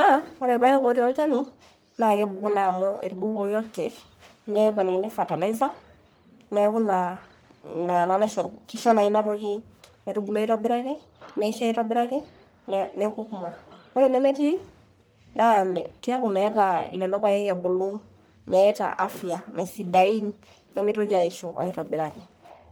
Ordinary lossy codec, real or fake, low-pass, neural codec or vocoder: none; fake; none; codec, 44.1 kHz, 1.7 kbps, Pupu-Codec